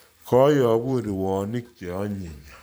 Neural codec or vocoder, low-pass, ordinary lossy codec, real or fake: vocoder, 44.1 kHz, 128 mel bands every 512 samples, BigVGAN v2; none; none; fake